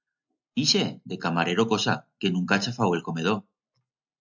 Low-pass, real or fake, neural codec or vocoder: 7.2 kHz; real; none